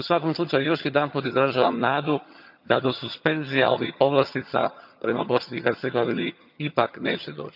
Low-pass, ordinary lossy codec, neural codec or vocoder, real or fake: 5.4 kHz; none; vocoder, 22.05 kHz, 80 mel bands, HiFi-GAN; fake